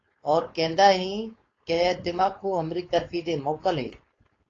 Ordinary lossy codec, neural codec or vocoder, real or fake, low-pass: AAC, 32 kbps; codec, 16 kHz, 4.8 kbps, FACodec; fake; 7.2 kHz